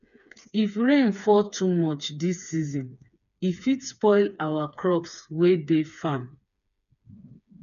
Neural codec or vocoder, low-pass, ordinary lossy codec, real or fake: codec, 16 kHz, 4 kbps, FreqCodec, smaller model; 7.2 kHz; none; fake